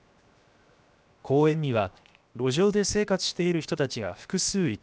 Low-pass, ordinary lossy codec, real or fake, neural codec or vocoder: none; none; fake; codec, 16 kHz, 0.7 kbps, FocalCodec